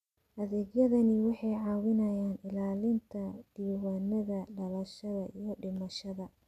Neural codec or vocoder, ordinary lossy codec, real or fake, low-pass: none; none; real; 14.4 kHz